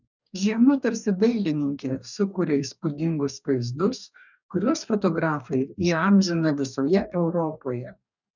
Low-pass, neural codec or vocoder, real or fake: 7.2 kHz; codec, 44.1 kHz, 2.6 kbps, DAC; fake